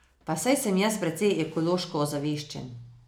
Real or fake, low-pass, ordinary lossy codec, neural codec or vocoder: real; none; none; none